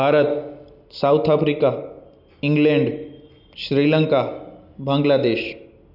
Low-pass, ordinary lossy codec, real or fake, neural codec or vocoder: 5.4 kHz; none; real; none